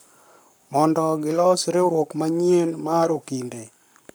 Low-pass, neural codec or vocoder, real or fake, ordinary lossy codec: none; codec, 44.1 kHz, 7.8 kbps, Pupu-Codec; fake; none